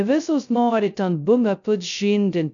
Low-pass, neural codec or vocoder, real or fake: 7.2 kHz; codec, 16 kHz, 0.2 kbps, FocalCodec; fake